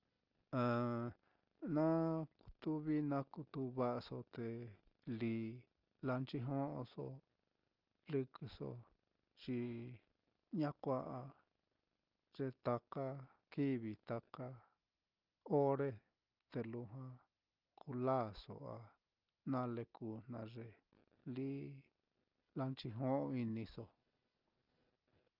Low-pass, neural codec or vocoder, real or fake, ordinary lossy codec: 5.4 kHz; none; real; Opus, 24 kbps